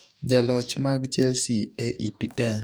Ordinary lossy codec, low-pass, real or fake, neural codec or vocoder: none; none; fake; codec, 44.1 kHz, 2.6 kbps, DAC